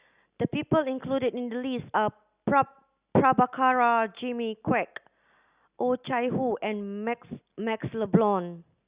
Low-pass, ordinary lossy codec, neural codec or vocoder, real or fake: 3.6 kHz; Opus, 64 kbps; none; real